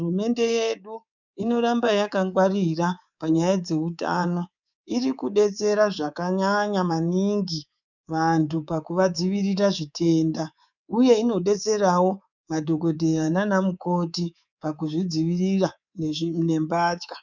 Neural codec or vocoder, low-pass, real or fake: codec, 24 kHz, 3.1 kbps, DualCodec; 7.2 kHz; fake